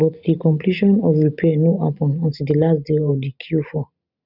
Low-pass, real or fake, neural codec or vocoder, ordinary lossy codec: 5.4 kHz; real; none; none